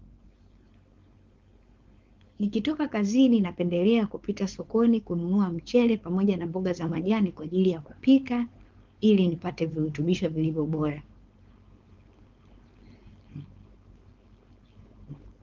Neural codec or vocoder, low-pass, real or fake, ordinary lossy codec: codec, 16 kHz, 4.8 kbps, FACodec; 7.2 kHz; fake; Opus, 32 kbps